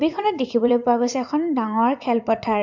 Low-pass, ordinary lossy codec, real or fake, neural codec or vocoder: 7.2 kHz; MP3, 64 kbps; fake; vocoder, 44.1 kHz, 80 mel bands, Vocos